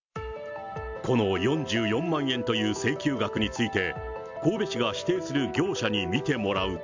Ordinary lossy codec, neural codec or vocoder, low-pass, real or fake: MP3, 64 kbps; none; 7.2 kHz; real